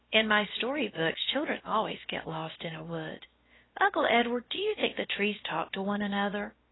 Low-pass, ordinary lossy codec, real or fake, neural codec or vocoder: 7.2 kHz; AAC, 16 kbps; fake; codec, 16 kHz, 0.7 kbps, FocalCodec